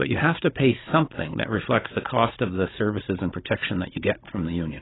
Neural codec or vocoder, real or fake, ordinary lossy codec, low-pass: codec, 16 kHz, 16 kbps, FunCodec, trained on Chinese and English, 50 frames a second; fake; AAC, 16 kbps; 7.2 kHz